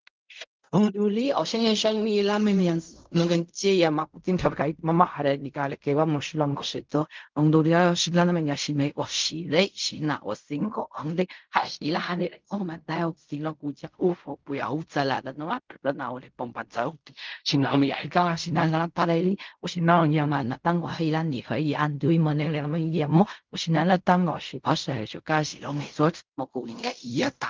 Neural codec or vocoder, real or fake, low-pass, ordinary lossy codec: codec, 16 kHz in and 24 kHz out, 0.4 kbps, LongCat-Audio-Codec, fine tuned four codebook decoder; fake; 7.2 kHz; Opus, 32 kbps